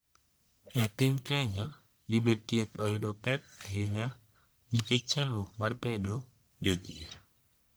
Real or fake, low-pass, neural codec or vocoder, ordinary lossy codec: fake; none; codec, 44.1 kHz, 1.7 kbps, Pupu-Codec; none